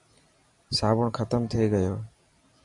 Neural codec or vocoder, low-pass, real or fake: vocoder, 44.1 kHz, 128 mel bands every 256 samples, BigVGAN v2; 10.8 kHz; fake